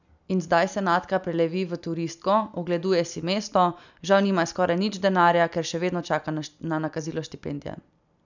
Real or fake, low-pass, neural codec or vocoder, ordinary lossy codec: real; 7.2 kHz; none; none